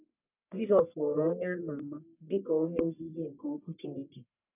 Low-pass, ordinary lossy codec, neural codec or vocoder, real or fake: 3.6 kHz; none; codec, 44.1 kHz, 1.7 kbps, Pupu-Codec; fake